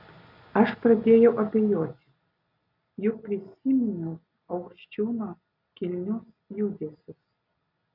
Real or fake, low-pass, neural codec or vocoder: real; 5.4 kHz; none